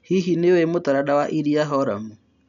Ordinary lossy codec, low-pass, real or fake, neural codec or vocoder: none; 7.2 kHz; real; none